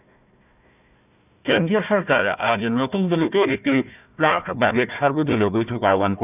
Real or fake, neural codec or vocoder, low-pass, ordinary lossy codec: fake; codec, 16 kHz, 1 kbps, FunCodec, trained on Chinese and English, 50 frames a second; 3.6 kHz; none